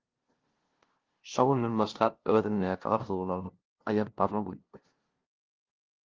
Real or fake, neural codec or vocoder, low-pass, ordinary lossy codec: fake; codec, 16 kHz, 0.5 kbps, FunCodec, trained on LibriTTS, 25 frames a second; 7.2 kHz; Opus, 16 kbps